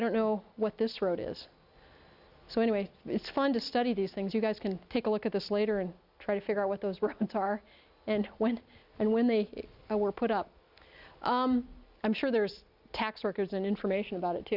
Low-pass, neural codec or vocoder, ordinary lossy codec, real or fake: 5.4 kHz; none; Opus, 64 kbps; real